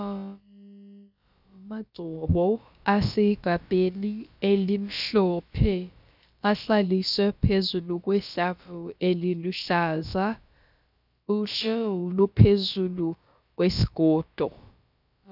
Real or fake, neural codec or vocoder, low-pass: fake; codec, 16 kHz, about 1 kbps, DyCAST, with the encoder's durations; 5.4 kHz